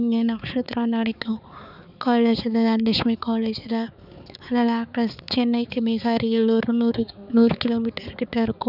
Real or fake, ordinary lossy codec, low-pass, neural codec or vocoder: fake; none; 5.4 kHz; codec, 16 kHz, 4 kbps, X-Codec, HuBERT features, trained on balanced general audio